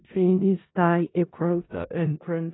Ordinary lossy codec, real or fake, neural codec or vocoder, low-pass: AAC, 16 kbps; fake; codec, 16 kHz in and 24 kHz out, 0.4 kbps, LongCat-Audio-Codec, four codebook decoder; 7.2 kHz